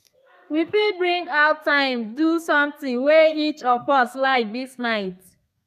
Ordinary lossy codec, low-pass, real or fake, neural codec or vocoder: none; 14.4 kHz; fake; codec, 32 kHz, 1.9 kbps, SNAC